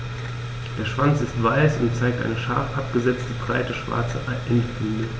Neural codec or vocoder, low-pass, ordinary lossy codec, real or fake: none; none; none; real